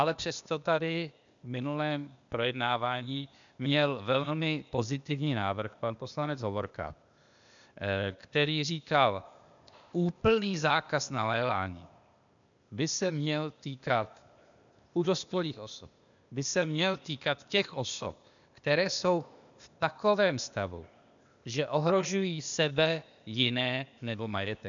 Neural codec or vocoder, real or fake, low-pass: codec, 16 kHz, 0.8 kbps, ZipCodec; fake; 7.2 kHz